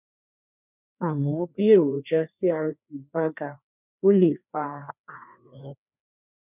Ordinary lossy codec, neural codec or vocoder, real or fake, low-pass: none; codec, 16 kHz, 2 kbps, FreqCodec, larger model; fake; 3.6 kHz